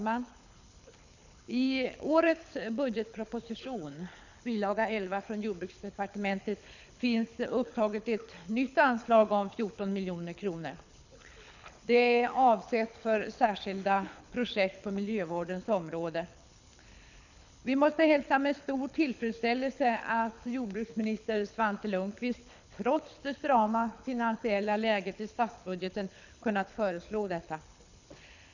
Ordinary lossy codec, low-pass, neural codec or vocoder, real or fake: none; 7.2 kHz; codec, 24 kHz, 6 kbps, HILCodec; fake